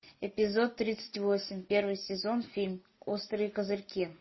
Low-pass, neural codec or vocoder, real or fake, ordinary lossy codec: 7.2 kHz; none; real; MP3, 24 kbps